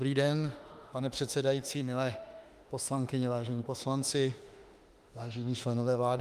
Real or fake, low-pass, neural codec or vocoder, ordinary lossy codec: fake; 14.4 kHz; autoencoder, 48 kHz, 32 numbers a frame, DAC-VAE, trained on Japanese speech; Opus, 32 kbps